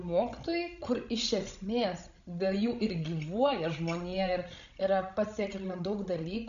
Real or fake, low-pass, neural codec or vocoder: fake; 7.2 kHz; codec, 16 kHz, 16 kbps, FreqCodec, larger model